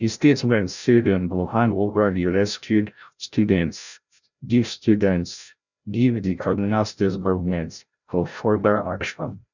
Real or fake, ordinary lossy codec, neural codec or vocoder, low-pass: fake; none; codec, 16 kHz, 0.5 kbps, FreqCodec, larger model; 7.2 kHz